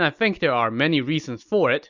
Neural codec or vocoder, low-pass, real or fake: none; 7.2 kHz; real